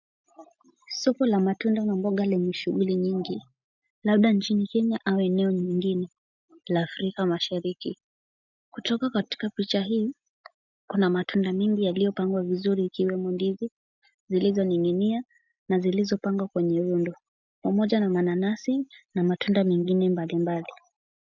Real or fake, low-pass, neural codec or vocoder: real; 7.2 kHz; none